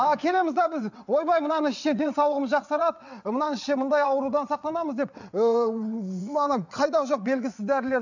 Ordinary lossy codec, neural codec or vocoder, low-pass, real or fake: none; vocoder, 22.05 kHz, 80 mel bands, WaveNeXt; 7.2 kHz; fake